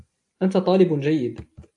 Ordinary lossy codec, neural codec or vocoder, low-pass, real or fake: AAC, 64 kbps; none; 10.8 kHz; real